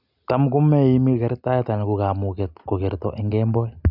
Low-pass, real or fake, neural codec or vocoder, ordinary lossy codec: 5.4 kHz; real; none; none